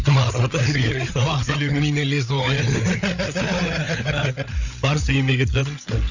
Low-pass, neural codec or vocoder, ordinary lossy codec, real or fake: 7.2 kHz; codec, 16 kHz, 8 kbps, FreqCodec, larger model; none; fake